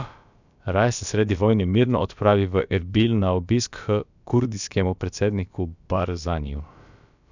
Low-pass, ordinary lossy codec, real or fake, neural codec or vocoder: 7.2 kHz; none; fake; codec, 16 kHz, about 1 kbps, DyCAST, with the encoder's durations